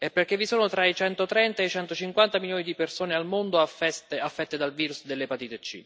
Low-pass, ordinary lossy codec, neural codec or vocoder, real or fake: none; none; none; real